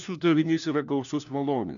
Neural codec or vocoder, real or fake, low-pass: codec, 16 kHz, 1 kbps, FunCodec, trained on LibriTTS, 50 frames a second; fake; 7.2 kHz